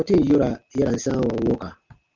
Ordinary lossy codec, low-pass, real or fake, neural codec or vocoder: Opus, 32 kbps; 7.2 kHz; real; none